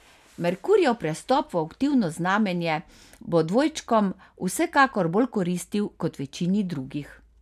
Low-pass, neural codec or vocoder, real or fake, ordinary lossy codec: 14.4 kHz; none; real; none